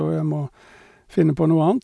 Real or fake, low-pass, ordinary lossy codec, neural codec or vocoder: real; none; none; none